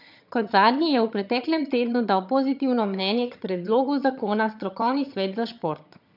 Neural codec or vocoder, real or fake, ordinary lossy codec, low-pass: vocoder, 22.05 kHz, 80 mel bands, HiFi-GAN; fake; none; 5.4 kHz